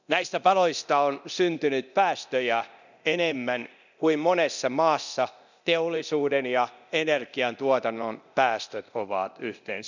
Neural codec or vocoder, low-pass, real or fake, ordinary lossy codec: codec, 24 kHz, 0.9 kbps, DualCodec; 7.2 kHz; fake; none